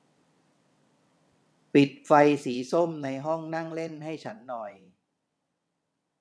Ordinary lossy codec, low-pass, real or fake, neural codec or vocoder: none; none; fake; vocoder, 22.05 kHz, 80 mel bands, WaveNeXt